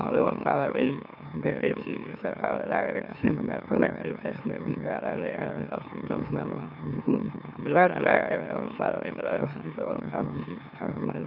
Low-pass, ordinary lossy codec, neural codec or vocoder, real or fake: 5.4 kHz; none; autoencoder, 44.1 kHz, a latent of 192 numbers a frame, MeloTTS; fake